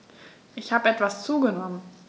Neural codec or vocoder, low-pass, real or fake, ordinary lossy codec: none; none; real; none